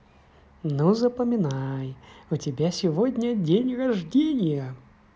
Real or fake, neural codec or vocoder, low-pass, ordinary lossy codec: real; none; none; none